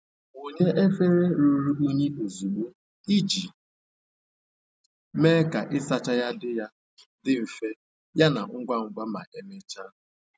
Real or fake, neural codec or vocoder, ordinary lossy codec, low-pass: real; none; none; none